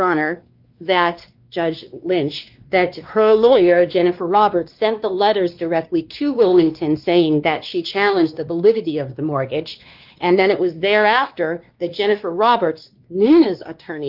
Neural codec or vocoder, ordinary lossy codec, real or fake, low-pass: codec, 16 kHz, 2 kbps, X-Codec, WavLM features, trained on Multilingual LibriSpeech; Opus, 32 kbps; fake; 5.4 kHz